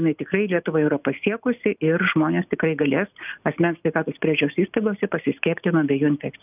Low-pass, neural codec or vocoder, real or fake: 3.6 kHz; none; real